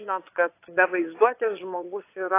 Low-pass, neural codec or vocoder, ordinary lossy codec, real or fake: 3.6 kHz; vocoder, 22.05 kHz, 80 mel bands, Vocos; AAC, 24 kbps; fake